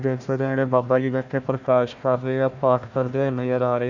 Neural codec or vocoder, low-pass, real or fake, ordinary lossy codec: codec, 16 kHz, 1 kbps, FunCodec, trained on Chinese and English, 50 frames a second; 7.2 kHz; fake; none